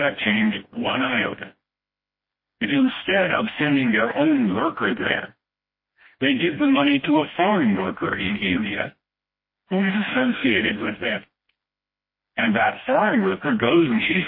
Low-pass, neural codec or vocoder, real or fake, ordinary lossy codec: 5.4 kHz; codec, 16 kHz, 1 kbps, FreqCodec, smaller model; fake; MP3, 24 kbps